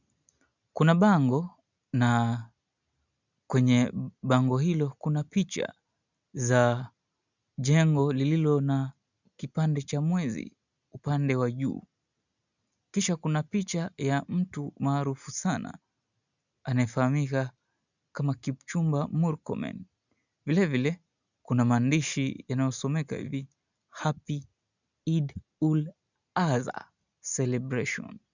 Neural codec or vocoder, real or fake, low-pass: none; real; 7.2 kHz